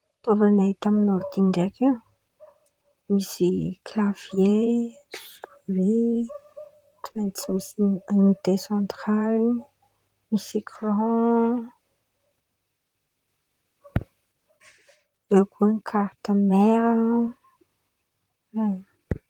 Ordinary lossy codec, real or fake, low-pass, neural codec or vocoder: Opus, 24 kbps; fake; 19.8 kHz; vocoder, 44.1 kHz, 128 mel bands, Pupu-Vocoder